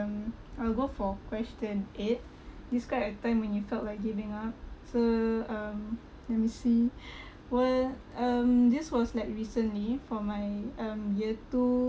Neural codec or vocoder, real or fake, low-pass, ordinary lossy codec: none; real; none; none